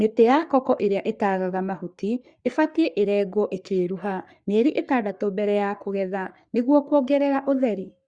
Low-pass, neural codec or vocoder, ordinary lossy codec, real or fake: 9.9 kHz; codec, 44.1 kHz, 3.4 kbps, Pupu-Codec; Opus, 64 kbps; fake